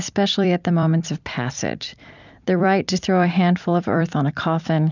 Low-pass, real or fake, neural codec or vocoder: 7.2 kHz; fake; vocoder, 44.1 kHz, 128 mel bands every 256 samples, BigVGAN v2